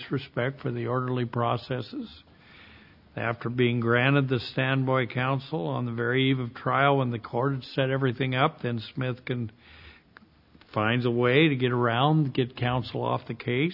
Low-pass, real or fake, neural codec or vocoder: 5.4 kHz; real; none